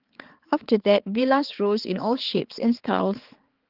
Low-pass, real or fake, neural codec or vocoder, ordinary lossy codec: 5.4 kHz; fake; codec, 16 kHz, 4 kbps, X-Codec, HuBERT features, trained on balanced general audio; Opus, 16 kbps